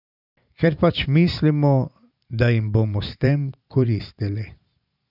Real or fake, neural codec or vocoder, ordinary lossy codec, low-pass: fake; vocoder, 44.1 kHz, 128 mel bands every 512 samples, BigVGAN v2; none; 5.4 kHz